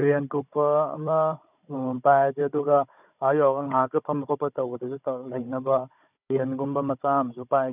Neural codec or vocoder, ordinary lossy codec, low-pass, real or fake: codec, 16 kHz, 16 kbps, FunCodec, trained on Chinese and English, 50 frames a second; none; 3.6 kHz; fake